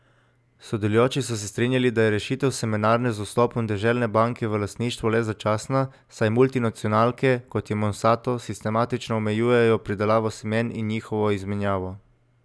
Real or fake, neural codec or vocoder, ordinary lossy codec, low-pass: real; none; none; none